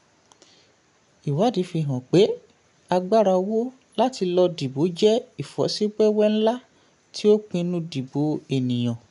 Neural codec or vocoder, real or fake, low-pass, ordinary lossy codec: none; real; 10.8 kHz; none